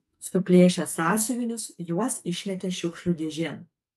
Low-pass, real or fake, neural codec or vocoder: 14.4 kHz; fake; codec, 44.1 kHz, 2.6 kbps, SNAC